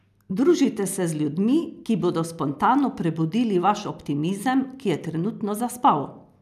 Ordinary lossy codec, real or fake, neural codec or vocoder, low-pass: none; real; none; 14.4 kHz